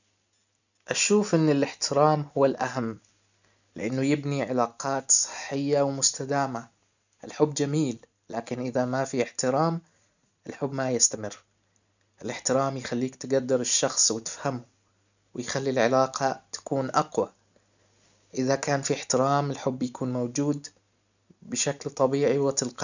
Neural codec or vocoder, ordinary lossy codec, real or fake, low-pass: none; none; real; 7.2 kHz